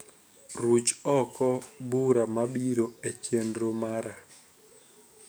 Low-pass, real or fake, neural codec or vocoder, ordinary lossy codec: none; fake; codec, 44.1 kHz, 7.8 kbps, DAC; none